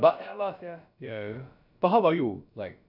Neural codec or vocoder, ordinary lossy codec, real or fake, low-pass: codec, 16 kHz, about 1 kbps, DyCAST, with the encoder's durations; none; fake; 5.4 kHz